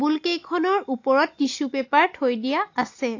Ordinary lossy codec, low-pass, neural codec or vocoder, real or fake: AAC, 48 kbps; 7.2 kHz; none; real